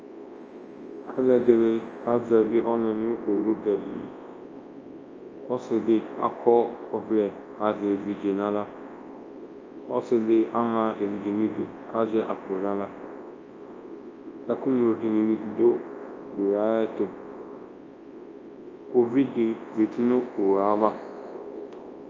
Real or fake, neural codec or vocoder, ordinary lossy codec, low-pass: fake; codec, 24 kHz, 0.9 kbps, WavTokenizer, large speech release; Opus, 24 kbps; 7.2 kHz